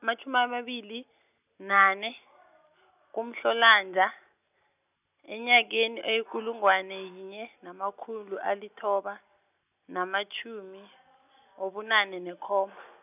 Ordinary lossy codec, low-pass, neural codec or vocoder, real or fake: none; 3.6 kHz; none; real